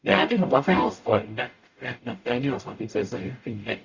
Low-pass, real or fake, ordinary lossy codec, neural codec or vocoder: 7.2 kHz; fake; Opus, 64 kbps; codec, 44.1 kHz, 0.9 kbps, DAC